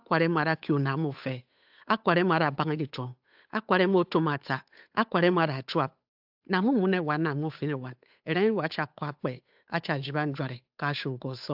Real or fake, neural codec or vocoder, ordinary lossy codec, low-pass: fake; codec, 16 kHz, 8 kbps, FunCodec, trained on Chinese and English, 25 frames a second; AAC, 48 kbps; 5.4 kHz